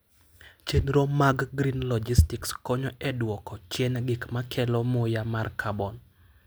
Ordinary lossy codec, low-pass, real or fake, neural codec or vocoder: none; none; real; none